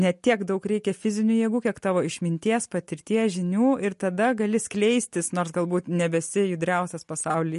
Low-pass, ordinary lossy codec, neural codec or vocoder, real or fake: 14.4 kHz; MP3, 48 kbps; none; real